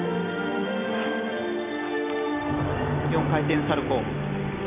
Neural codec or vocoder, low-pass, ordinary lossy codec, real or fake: none; 3.6 kHz; none; real